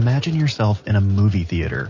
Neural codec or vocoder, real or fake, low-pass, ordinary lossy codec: none; real; 7.2 kHz; MP3, 32 kbps